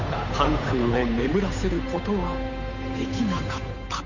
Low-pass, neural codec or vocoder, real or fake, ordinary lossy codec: 7.2 kHz; codec, 16 kHz, 8 kbps, FunCodec, trained on Chinese and English, 25 frames a second; fake; none